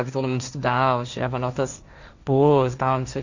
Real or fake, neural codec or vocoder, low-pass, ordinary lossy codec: fake; codec, 16 kHz, 1.1 kbps, Voila-Tokenizer; 7.2 kHz; Opus, 64 kbps